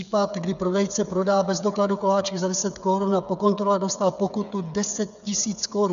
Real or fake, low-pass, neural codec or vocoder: fake; 7.2 kHz; codec, 16 kHz, 8 kbps, FreqCodec, smaller model